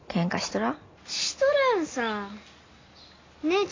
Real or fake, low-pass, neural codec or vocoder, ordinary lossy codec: real; 7.2 kHz; none; AAC, 32 kbps